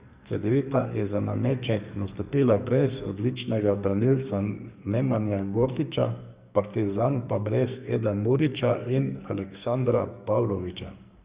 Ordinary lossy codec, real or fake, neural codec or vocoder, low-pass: Opus, 64 kbps; fake; codec, 44.1 kHz, 2.6 kbps, SNAC; 3.6 kHz